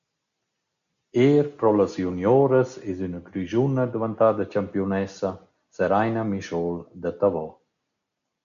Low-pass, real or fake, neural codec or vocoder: 7.2 kHz; real; none